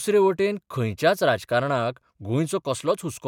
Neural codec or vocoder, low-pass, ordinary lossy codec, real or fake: none; 19.8 kHz; none; real